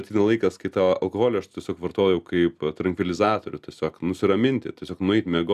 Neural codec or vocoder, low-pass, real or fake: none; 14.4 kHz; real